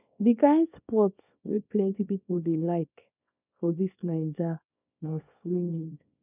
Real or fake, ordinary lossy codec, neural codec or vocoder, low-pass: fake; none; codec, 24 kHz, 0.9 kbps, WavTokenizer, small release; 3.6 kHz